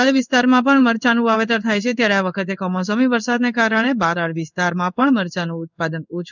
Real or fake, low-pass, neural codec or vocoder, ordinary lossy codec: fake; 7.2 kHz; codec, 16 kHz in and 24 kHz out, 1 kbps, XY-Tokenizer; none